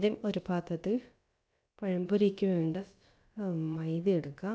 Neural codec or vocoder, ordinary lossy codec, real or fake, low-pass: codec, 16 kHz, about 1 kbps, DyCAST, with the encoder's durations; none; fake; none